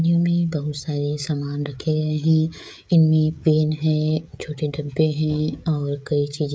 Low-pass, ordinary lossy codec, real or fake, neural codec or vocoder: none; none; fake; codec, 16 kHz, 16 kbps, FreqCodec, smaller model